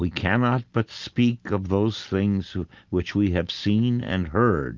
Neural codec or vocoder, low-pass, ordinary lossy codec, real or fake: none; 7.2 kHz; Opus, 32 kbps; real